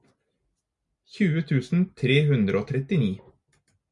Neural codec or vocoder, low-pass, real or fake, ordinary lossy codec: none; 10.8 kHz; real; MP3, 96 kbps